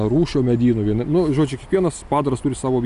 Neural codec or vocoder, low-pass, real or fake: none; 10.8 kHz; real